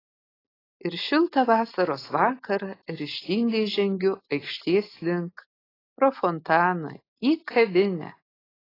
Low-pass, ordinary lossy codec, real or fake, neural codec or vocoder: 5.4 kHz; AAC, 24 kbps; real; none